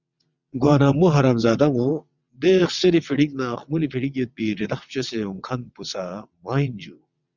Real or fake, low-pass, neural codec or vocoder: fake; 7.2 kHz; vocoder, 22.05 kHz, 80 mel bands, WaveNeXt